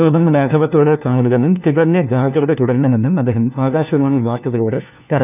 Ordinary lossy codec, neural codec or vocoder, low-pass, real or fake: none; codec, 16 kHz, 1 kbps, FunCodec, trained on LibriTTS, 50 frames a second; 3.6 kHz; fake